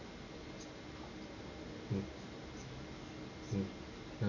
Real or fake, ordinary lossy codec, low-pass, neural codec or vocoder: real; none; 7.2 kHz; none